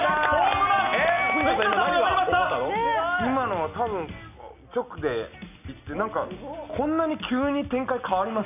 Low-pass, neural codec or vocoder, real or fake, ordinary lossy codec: 3.6 kHz; none; real; none